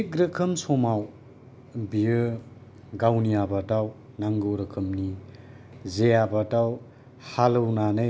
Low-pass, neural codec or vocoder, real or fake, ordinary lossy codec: none; none; real; none